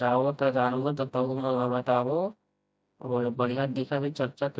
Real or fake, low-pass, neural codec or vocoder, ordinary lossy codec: fake; none; codec, 16 kHz, 1 kbps, FreqCodec, smaller model; none